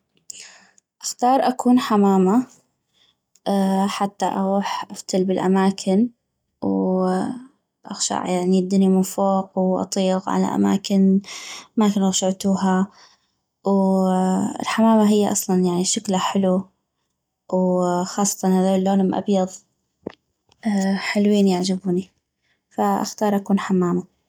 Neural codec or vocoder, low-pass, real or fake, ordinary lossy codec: none; 19.8 kHz; real; none